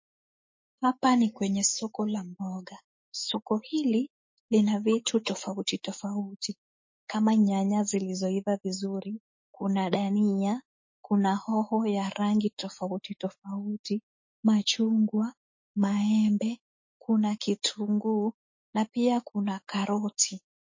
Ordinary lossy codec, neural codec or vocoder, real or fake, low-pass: MP3, 32 kbps; autoencoder, 48 kHz, 128 numbers a frame, DAC-VAE, trained on Japanese speech; fake; 7.2 kHz